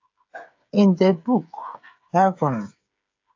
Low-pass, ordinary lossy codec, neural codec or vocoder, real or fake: 7.2 kHz; AAC, 48 kbps; codec, 16 kHz, 8 kbps, FreqCodec, smaller model; fake